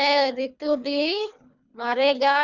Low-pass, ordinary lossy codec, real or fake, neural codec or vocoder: 7.2 kHz; none; fake; codec, 24 kHz, 3 kbps, HILCodec